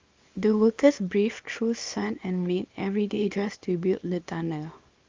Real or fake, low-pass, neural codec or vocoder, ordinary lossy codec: fake; 7.2 kHz; codec, 24 kHz, 0.9 kbps, WavTokenizer, small release; Opus, 32 kbps